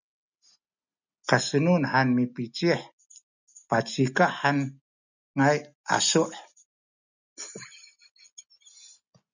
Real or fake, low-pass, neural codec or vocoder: real; 7.2 kHz; none